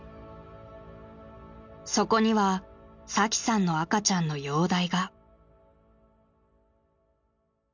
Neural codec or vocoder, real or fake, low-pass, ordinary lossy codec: none; real; 7.2 kHz; none